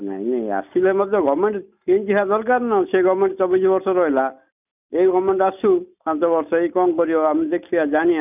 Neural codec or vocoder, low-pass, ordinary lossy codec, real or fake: none; 3.6 kHz; none; real